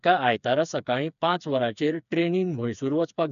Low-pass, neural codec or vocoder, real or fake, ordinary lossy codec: 7.2 kHz; codec, 16 kHz, 4 kbps, FreqCodec, smaller model; fake; none